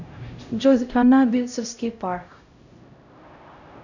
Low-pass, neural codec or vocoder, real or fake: 7.2 kHz; codec, 16 kHz, 0.5 kbps, X-Codec, HuBERT features, trained on LibriSpeech; fake